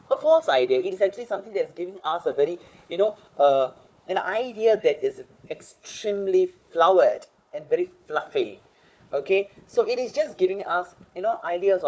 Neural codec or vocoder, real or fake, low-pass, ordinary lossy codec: codec, 16 kHz, 4 kbps, FunCodec, trained on Chinese and English, 50 frames a second; fake; none; none